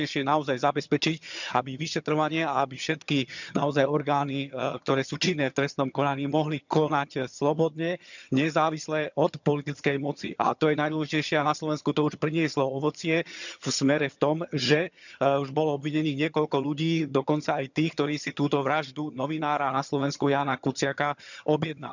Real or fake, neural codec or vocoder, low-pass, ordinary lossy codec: fake; vocoder, 22.05 kHz, 80 mel bands, HiFi-GAN; 7.2 kHz; none